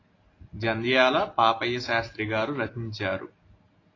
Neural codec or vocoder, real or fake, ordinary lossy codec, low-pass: none; real; AAC, 32 kbps; 7.2 kHz